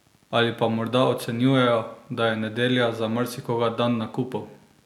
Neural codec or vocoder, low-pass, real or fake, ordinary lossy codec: none; 19.8 kHz; real; none